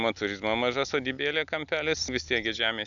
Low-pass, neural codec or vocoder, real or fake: 7.2 kHz; none; real